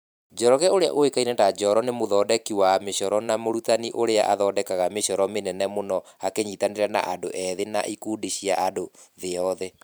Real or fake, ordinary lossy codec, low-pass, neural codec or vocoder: fake; none; none; vocoder, 44.1 kHz, 128 mel bands every 512 samples, BigVGAN v2